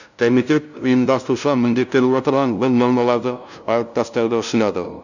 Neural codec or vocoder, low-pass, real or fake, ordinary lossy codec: codec, 16 kHz, 0.5 kbps, FunCodec, trained on LibriTTS, 25 frames a second; 7.2 kHz; fake; none